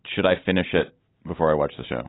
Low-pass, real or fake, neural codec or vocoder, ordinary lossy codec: 7.2 kHz; real; none; AAC, 16 kbps